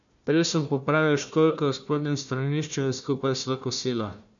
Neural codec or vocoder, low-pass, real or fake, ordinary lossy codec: codec, 16 kHz, 1 kbps, FunCodec, trained on Chinese and English, 50 frames a second; 7.2 kHz; fake; none